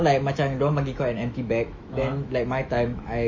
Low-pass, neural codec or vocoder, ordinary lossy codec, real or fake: 7.2 kHz; autoencoder, 48 kHz, 128 numbers a frame, DAC-VAE, trained on Japanese speech; MP3, 32 kbps; fake